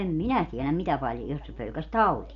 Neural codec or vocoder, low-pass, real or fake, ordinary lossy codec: none; 7.2 kHz; real; none